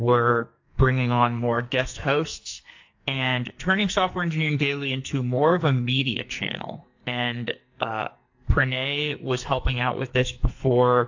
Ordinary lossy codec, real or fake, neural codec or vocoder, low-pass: AAC, 48 kbps; fake; codec, 44.1 kHz, 2.6 kbps, SNAC; 7.2 kHz